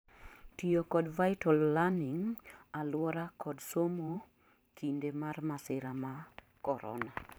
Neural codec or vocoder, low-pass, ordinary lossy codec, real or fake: vocoder, 44.1 kHz, 128 mel bands every 512 samples, BigVGAN v2; none; none; fake